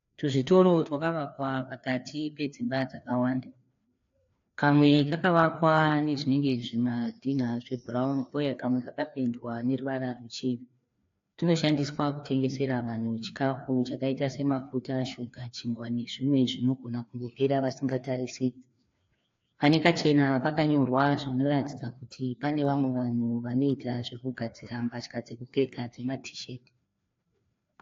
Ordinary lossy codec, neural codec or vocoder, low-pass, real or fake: AAC, 48 kbps; codec, 16 kHz, 2 kbps, FreqCodec, larger model; 7.2 kHz; fake